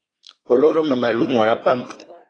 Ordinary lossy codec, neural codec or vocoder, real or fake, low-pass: AAC, 32 kbps; codec, 24 kHz, 1 kbps, SNAC; fake; 9.9 kHz